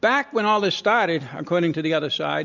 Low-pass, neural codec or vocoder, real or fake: 7.2 kHz; none; real